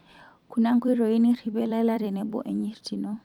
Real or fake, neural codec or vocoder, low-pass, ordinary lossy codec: fake; vocoder, 44.1 kHz, 128 mel bands every 256 samples, BigVGAN v2; 19.8 kHz; none